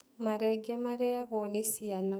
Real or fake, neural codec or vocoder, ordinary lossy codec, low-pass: fake; codec, 44.1 kHz, 2.6 kbps, SNAC; none; none